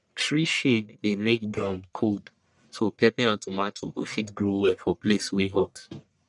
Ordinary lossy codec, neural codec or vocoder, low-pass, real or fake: none; codec, 44.1 kHz, 1.7 kbps, Pupu-Codec; 10.8 kHz; fake